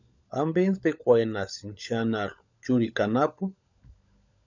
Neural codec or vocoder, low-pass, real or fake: codec, 16 kHz, 16 kbps, FunCodec, trained on LibriTTS, 50 frames a second; 7.2 kHz; fake